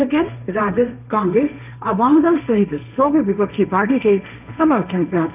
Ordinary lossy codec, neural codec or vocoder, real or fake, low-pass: none; codec, 16 kHz, 1.1 kbps, Voila-Tokenizer; fake; 3.6 kHz